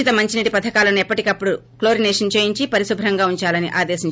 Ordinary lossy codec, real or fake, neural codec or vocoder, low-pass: none; real; none; none